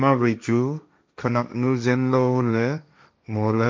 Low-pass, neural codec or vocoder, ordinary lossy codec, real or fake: none; codec, 16 kHz, 1.1 kbps, Voila-Tokenizer; none; fake